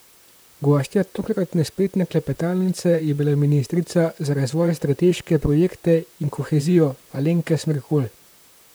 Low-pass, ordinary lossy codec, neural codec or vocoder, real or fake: none; none; vocoder, 44.1 kHz, 128 mel bands, Pupu-Vocoder; fake